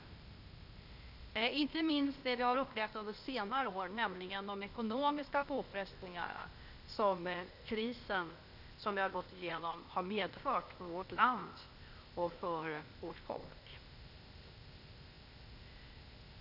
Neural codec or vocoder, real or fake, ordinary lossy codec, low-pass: codec, 16 kHz, 0.8 kbps, ZipCodec; fake; none; 5.4 kHz